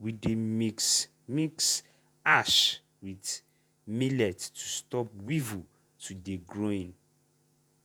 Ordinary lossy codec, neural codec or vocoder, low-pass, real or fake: none; vocoder, 48 kHz, 128 mel bands, Vocos; none; fake